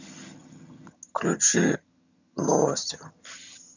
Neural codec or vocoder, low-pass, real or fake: vocoder, 22.05 kHz, 80 mel bands, HiFi-GAN; 7.2 kHz; fake